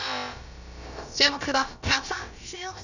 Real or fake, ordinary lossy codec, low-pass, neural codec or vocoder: fake; none; 7.2 kHz; codec, 16 kHz, about 1 kbps, DyCAST, with the encoder's durations